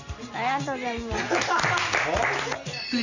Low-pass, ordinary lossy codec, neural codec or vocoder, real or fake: 7.2 kHz; none; none; real